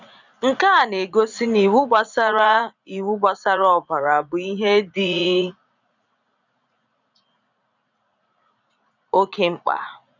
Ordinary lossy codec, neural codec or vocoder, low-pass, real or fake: none; vocoder, 44.1 kHz, 80 mel bands, Vocos; 7.2 kHz; fake